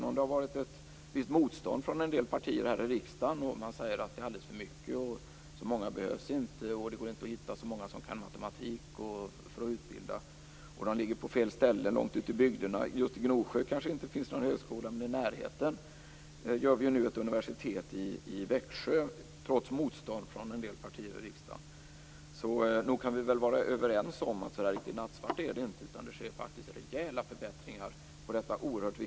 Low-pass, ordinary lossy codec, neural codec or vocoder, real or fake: none; none; none; real